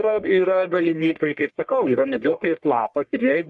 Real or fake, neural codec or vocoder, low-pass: fake; codec, 44.1 kHz, 1.7 kbps, Pupu-Codec; 10.8 kHz